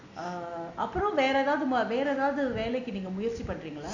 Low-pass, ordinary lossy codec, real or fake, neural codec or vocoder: 7.2 kHz; none; real; none